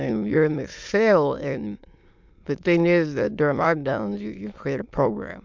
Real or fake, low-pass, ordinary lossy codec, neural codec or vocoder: fake; 7.2 kHz; MP3, 64 kbps; autoencoder, 22.05 kHz, a latent of 192 numbers a frame, VITS, trained on many speakers